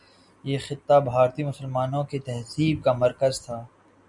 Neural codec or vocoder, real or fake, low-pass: none; real; 10.8 kHz